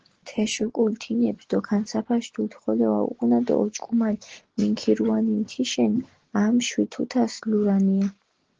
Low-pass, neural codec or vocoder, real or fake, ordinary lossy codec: 7.2 kHz; none; real; Opus, 16 kbps